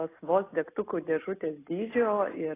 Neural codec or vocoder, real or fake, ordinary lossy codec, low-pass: none; real; AAC, 16 kbps; 3.6 kHz